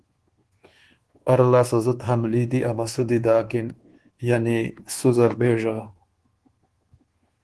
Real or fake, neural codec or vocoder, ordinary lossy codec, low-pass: fake; codec, 24 kHz, 1.2 kbps, DualCodec; Opus, 16 kbps; 10.8 kHz